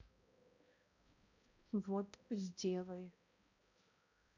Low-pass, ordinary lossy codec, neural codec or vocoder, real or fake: 7.2 kHz; none; codec, 16 kHz, 0.5 kbps, X-Codec, HuBERT features, trained on balanced general audio; fake